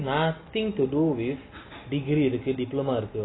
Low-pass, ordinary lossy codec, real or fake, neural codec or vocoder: 7.2 kHz; AAC, 16 kbps; real; none